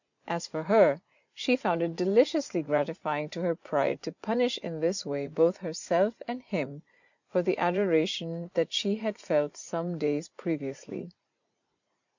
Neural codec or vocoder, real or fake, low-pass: vocoder, 44.1 kHz, 80 mel bands, Vocos; fake; 7.2 kHz